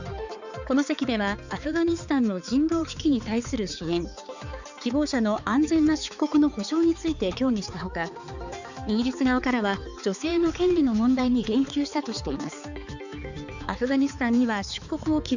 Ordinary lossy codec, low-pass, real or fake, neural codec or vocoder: none; 7.2 kHz; fake; codec, 16 kHz, 4 kbps, X-Codec, HuBERT features, trained on balanced general audio